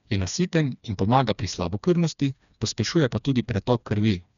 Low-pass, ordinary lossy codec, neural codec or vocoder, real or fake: 7.2 kHz; MP3, 96 kbps; codec, 16 kHz, 2 kbps, FreqCodec, smaller model; fake